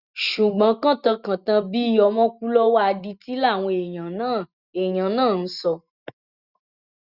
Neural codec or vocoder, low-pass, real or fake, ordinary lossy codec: none; 5.4 kHz; real; Opus, 64 kbps